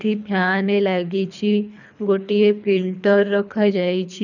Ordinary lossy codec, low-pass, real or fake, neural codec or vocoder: none; 7.2 kHz; fake; codec, 24 kHz, 3 kbps, HILCodec